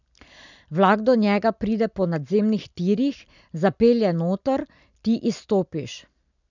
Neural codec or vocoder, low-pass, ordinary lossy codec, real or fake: none; 7.2 kHz; none; real